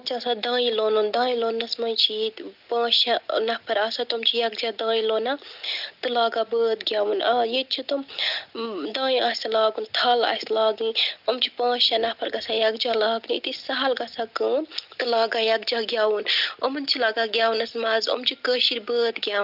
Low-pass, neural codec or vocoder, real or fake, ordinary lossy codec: 5.4 kHz; none; real; none